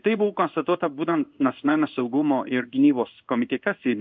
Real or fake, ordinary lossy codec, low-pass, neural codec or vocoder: fake; MP3, 64 kbps; 7.2 kHz; codec, 16 kHz in and 24 kHz out, 1 kbps, XY-Tokenizer